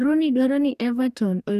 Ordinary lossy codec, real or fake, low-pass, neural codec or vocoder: none; fake; 14.4 kHz; codec, 44.1 kHz, 2.6 kbps, DAC